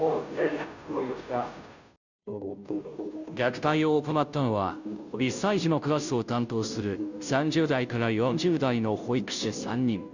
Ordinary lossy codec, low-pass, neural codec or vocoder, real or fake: none; 7.2 kHz; codec, 16 kHz, 0.5 kbps, FunCodec, trained on Chinese and English, 25 frames a second; fake